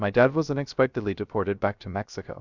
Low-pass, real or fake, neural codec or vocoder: 7.2 kHz; fake; codec, 16 kHz, 0.3 kbps, FocalCodec